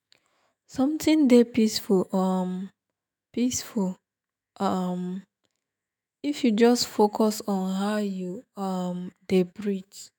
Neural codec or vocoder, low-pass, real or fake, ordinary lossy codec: autoencoder, 48 kHz, 128 numbers a frame, DAC-VAE, trained on Japanese speech; none; fake; none